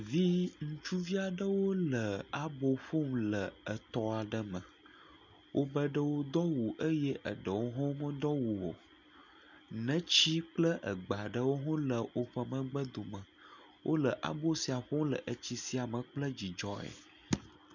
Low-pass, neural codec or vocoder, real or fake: 7.2 kHz; none; real